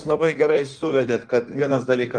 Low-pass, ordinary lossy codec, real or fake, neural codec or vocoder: 9.9 kHz; Opus, 32 kbps; fake; codec, 16 kHz in and 24 kHz out, 1.1 kbps, FireRedTTS-2 codec